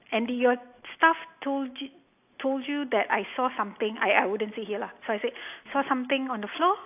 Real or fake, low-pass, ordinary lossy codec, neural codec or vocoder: real; 3.6 kHz; AAC, 32 kbps; none